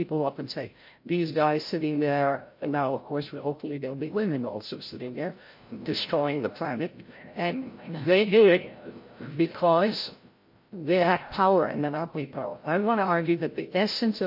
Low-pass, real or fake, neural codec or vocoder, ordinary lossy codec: 5.4 kHz; fake; codec, 16 kHz, 0.5 kbps, FreqCodec, larger model; MP3, 32 kbps